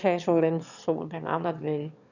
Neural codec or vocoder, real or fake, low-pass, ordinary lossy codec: autoencoder, 22.05 kHz, a latent of 192 numbers a frame, VITS, trained on one speaker; fake; 7.2 kHz; none